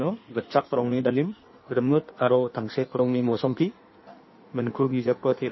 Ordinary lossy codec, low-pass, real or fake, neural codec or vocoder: MP3, 24 kbps; 7.2 kHz; fake; codec, 16 kHz in and 24 kHz out, 1.1 kbps, FireRedTTS-2 codec